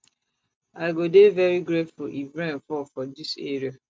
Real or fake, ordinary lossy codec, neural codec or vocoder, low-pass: real; none; none; none